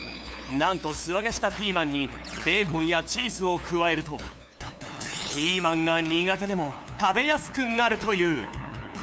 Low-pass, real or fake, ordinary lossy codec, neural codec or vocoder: none; fake; none; codec, 16 kHz, 2 kbps, FunCodec, trained on LibriTTS, 25 frames a second